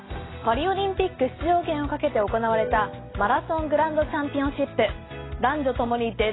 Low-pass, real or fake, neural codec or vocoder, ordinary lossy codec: 7.2 kHz; real; none; AAC, 16 kbps